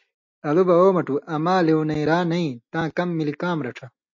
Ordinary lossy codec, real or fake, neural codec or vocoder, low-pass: MP3, 48 kbps; real; none; 7.2 kHz